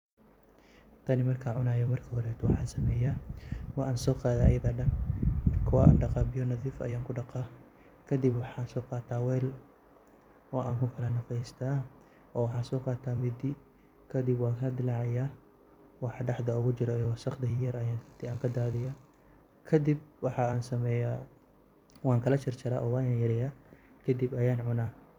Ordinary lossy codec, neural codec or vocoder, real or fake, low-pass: none; vocoder, 44.1 kHz, 128 mel bands every 256 samples, BigVGAN v2; fake; 19.8 kHz